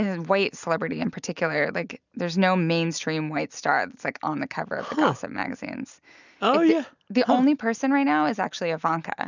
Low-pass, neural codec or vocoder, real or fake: 7.2 kHz; none; real